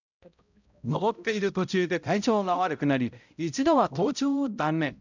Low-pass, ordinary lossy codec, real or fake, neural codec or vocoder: 7.2 kHz; none; fake; codec, 16 kHz, 0.5 kbps, X-Codec, HuBERT features, trained on balanced general audio